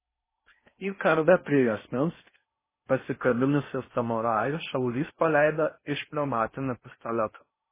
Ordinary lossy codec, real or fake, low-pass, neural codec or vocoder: MP3, 16 kbps; fake; 3.6 kHz; codec, 16 kHz in and 24 kHz out, 0.6 kbps, FocalCodec, streaming, 4096 codes